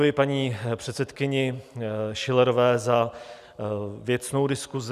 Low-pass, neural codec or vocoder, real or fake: 14.4 kHz; none; real